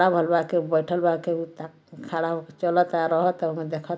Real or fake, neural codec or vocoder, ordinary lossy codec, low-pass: real; none; none; none